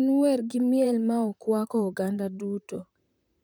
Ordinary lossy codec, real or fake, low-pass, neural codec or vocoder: none; fake; none; vocoder, 44.1 kHz, 128 mel bands, Pupu-Vocoder